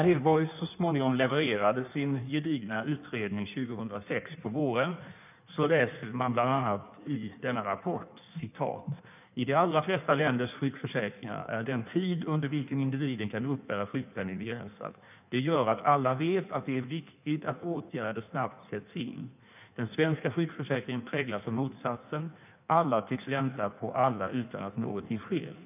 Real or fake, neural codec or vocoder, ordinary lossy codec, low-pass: fake; codec, 16 kHz in and 24 kHz out, 1.1 kbps, FireRedTTS-2 codec; none; 3.6 kHz